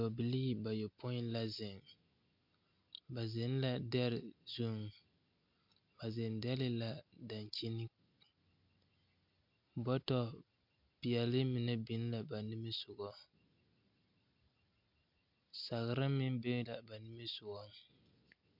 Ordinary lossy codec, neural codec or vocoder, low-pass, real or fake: MP3, 48 kbps; none; 5.4 kHz; real